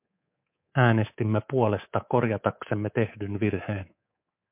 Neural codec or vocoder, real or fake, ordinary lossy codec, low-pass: codec, 24 kHz, 3.1 kbps, DualCodec; fake; MP3, 32 kbps; 3.6 kHz